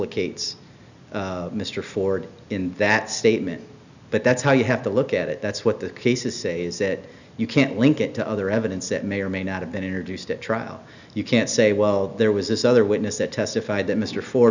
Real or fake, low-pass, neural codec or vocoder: real; 7.2 kHz; none